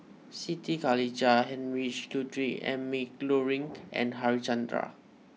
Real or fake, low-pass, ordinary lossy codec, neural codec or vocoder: real; none; none; none